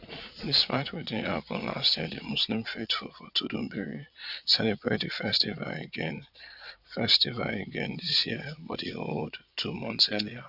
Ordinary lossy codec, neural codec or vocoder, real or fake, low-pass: none; vocoder, 22.05 kHz, 80 mel bands, Vocos; fake; 5.4 kHz